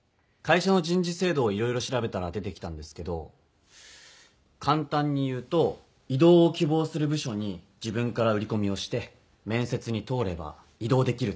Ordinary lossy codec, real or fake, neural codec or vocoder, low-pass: none; real; none; none